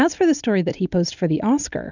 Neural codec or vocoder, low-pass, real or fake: none; 7.2 kHz; real